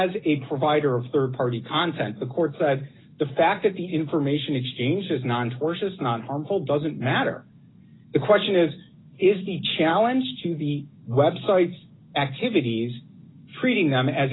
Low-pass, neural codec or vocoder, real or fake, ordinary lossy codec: 7.2 kHz; none; real; AAC, 16 kbps